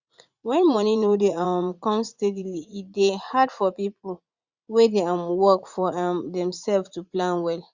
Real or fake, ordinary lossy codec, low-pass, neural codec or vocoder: fake; Opus, 64 kbps; 7.2 kHz; vocoder, 44.1 kHz, 80 mel bands, Vocos